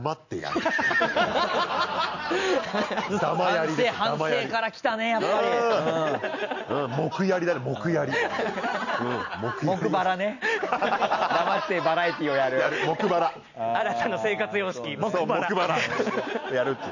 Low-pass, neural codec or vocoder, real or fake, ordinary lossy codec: 7.2 kHz; none; real; none